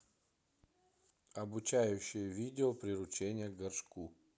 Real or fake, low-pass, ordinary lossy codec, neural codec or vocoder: real; none; none; none